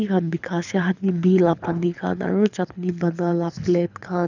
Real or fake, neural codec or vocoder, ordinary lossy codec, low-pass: fake; codec, 24 kHz, 6 kbps, HILCodec; none; 7.2 kHz